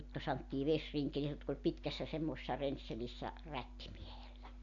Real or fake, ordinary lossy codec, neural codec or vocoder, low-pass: real; none; none; 7.2 kHz